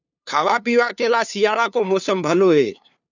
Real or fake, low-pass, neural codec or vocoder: fake; 7.2 kHz; codec, 16 kHz, 2 kbps, FunCodec, trained on LibriTTS, 25 frames a second